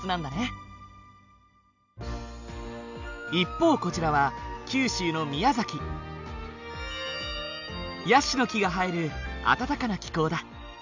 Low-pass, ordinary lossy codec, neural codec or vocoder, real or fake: 7.2 kHz; none; none; real